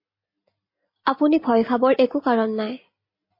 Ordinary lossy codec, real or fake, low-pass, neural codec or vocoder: MP3, 24 kbps; real; 5.4 kHz; none